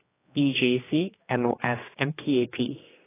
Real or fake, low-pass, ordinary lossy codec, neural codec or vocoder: fake; 3.6 kHz; AAC, 16 kbps; codec, 16 kHz, 2 kbps, X-Codec, HuBERT features, trained on general audio